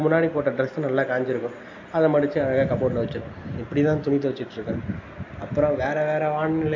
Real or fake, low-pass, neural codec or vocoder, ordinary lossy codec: real; 7.2 kHz; none; AAC, 48 kbps